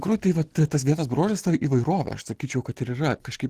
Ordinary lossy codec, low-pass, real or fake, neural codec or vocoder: Opus, 16 kbps; 14.4 kHz; real; none